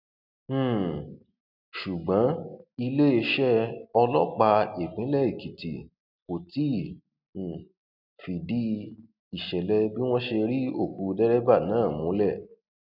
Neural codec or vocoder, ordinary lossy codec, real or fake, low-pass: none; none; real; 5.4 kHz